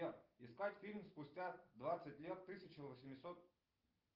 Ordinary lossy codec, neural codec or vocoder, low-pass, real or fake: Opus, 24 kbps; none; 5.4 kHz; real